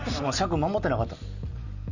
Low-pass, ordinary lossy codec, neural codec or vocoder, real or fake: 7.2 kHz; none; none; real